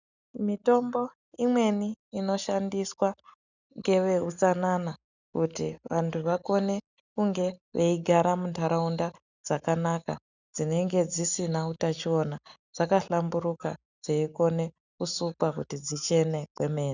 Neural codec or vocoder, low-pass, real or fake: none; 7.2 kHz; real